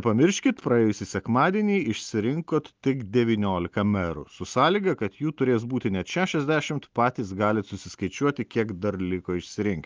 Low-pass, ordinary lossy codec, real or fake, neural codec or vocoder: 7.2 kHz; Opus, 24 kbps; real; none